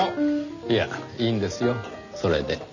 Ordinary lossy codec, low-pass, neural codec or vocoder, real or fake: none; 7.2 kHz; none; real